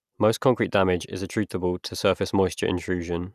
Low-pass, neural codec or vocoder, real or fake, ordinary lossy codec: 14.4 kHz; none; real; none